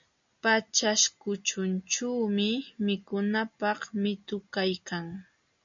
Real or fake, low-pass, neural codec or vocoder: real; 7.2 kHz; none